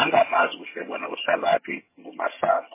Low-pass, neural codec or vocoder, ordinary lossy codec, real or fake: 3.6 kHz; vocoder, 22.05 kHz, 80 mel bands, HiFi-GAN; MP3, 16 kbps; fake